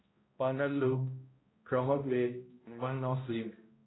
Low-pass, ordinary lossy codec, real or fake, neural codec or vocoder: 7.2 kHz; AAC, 16 kbps; fake; codec, 16 kHz, 0.5 kbps, X-Codec, HuBERT features, trained on balanced general audio